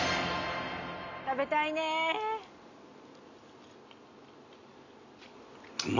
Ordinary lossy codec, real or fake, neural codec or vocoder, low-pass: none; real; none; 7.2 kHz